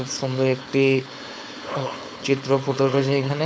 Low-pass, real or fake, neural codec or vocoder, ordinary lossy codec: none; fake; codec, 16 kHz, 4.8 kbps, FACodec; none